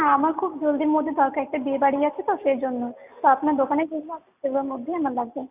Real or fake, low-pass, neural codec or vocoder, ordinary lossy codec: real; 3.6 kHz; none; none